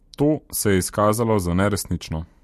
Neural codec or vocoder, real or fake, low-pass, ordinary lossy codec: none; real; 14.4 kHz; MP3, 64 kbps